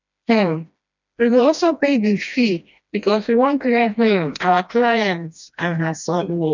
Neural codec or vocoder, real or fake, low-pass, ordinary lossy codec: codec, 16 kHz, 1 kbps, FreqCodec, smaller model; fake; 7.2 kHz; none